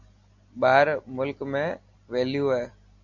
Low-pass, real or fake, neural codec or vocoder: 7.2 kHz; real; none